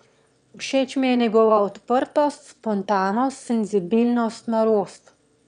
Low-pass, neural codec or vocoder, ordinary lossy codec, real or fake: 9.9 kHz; autoencoder, 22.05 kHz, a latent of 192 numbers a frame, VITS, trained on one speaker; none; fake